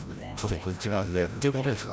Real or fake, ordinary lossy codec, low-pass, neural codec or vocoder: fake; none; none; codec, 16 kHz, 0.5 kbps, FreqCodec, larger model